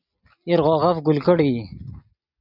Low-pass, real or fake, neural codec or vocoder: 5.4 kHz; real; none